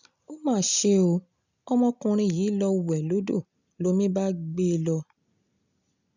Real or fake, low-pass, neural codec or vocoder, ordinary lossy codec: real; 7.2 kHz; none; none